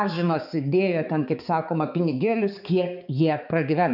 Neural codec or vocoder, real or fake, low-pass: codec, 16 kHz, 4 kbps, X-Codec, HuBERT features, trained on balanced general audio; fake; 5.4 kHz